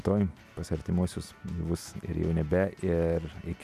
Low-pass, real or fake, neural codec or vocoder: 14.4 kHz; real; none